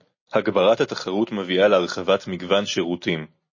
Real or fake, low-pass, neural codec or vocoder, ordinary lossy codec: real; 7.2 kHz; none; MP3, 32 kbps